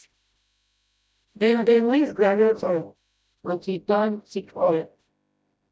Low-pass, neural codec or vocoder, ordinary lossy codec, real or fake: none; codec, 16 kHz, 0.5 kbps, FreqCodec, smaller model; none; fake